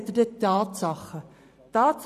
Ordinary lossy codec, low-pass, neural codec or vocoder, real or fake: none; 14.4 kHz; vocoder, 44.1 kHz, 128 mel bands every 512 samples, BigVGAN v2; fake